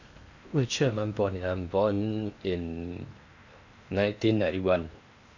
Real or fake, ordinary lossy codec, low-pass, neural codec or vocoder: fake; none; 7.2 kHz; codec, 16 kHz in and 24 kHz out, 0.8 kbps, FocalCodec, streaming, 65536 codes